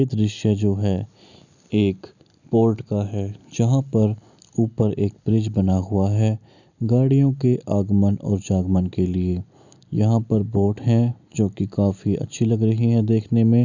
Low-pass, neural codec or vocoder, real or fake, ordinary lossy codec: 7.2 kHz; none; real; none